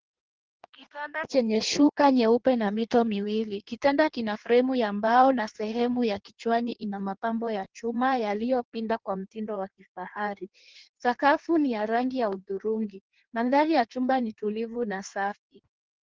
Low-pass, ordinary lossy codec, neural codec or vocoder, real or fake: 7.2 kHz; Opus, 16 kbps; codec, 16 kHz in and 24 kHz out, 1.1 kbps, FireRedTTS-2 codec; fake